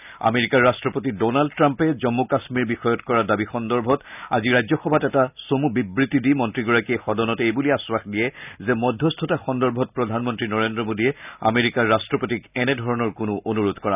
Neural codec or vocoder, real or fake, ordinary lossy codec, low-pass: none; real; none; 3.6 kHz